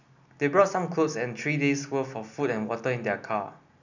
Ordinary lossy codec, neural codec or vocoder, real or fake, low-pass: none; none; real; 7.2 kHz